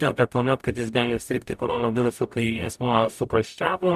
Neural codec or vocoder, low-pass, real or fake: codec, 44.1 kHz, 0.9 kbps, DAC; 14.4 kHz; fake